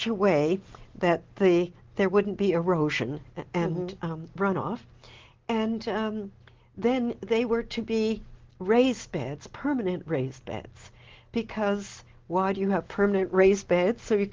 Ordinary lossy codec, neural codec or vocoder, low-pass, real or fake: Opus, 16 kbps; none; 7.2 kHz; real